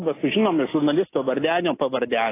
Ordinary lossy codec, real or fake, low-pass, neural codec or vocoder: AAC, 16 kbps; real; 3.6 kHz; none